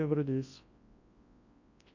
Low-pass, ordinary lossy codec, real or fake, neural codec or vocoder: 7.2 kHz; AAC, 48 kbps; fake; codec, 24 kHz, 0.9 kbps, WavTokenizer, large speech release